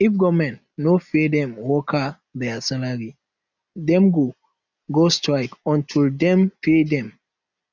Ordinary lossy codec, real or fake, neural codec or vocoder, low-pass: none; real; none; 7.2 kHz